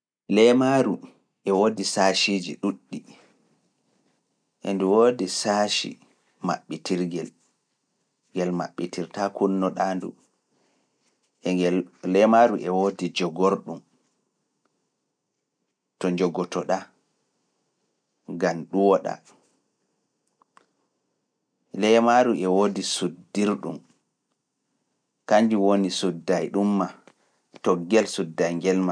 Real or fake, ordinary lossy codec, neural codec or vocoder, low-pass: real; none; none; 9.9 kHz